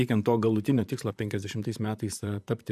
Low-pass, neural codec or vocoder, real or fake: 14.4 kHz; vocoder, 44.1 kHz, 128 mel bands, Pupu-Vocoder; fake